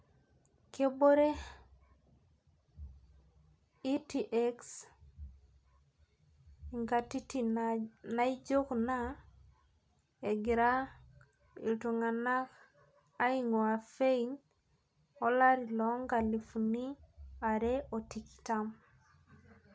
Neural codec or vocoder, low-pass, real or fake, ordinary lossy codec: none; none; real; none